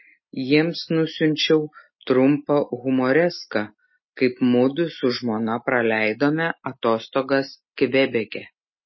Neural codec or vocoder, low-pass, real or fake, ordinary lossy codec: none; 7.2 kHz; real; MP3, 24 kbps